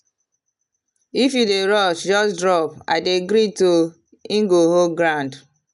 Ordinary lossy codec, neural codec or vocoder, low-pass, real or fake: none; none; 10.8 kHz; real